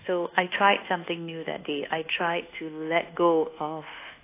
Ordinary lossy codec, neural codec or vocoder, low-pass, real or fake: AAC, 24 kbps; codec, 24 kHz, 1.2 kbps, DualCodec; 3.6 kHz; fake